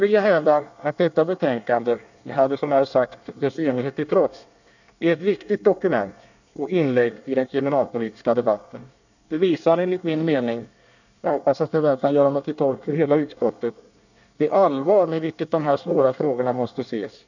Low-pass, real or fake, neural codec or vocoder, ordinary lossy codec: 7.2 kHz; fake; codec, 24 kHz, 1 kbps, SNAC; none